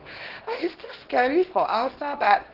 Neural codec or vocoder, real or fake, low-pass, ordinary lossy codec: codec, 24 kHz, 0.9 kbps, WavTokenizer, small release; fake; 5.4 kHz; Opus, 16 kbps